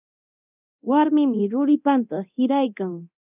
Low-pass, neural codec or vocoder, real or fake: 3.6 kHz; codec, 24 kHz, 0.9 kbps, DualCodec; fake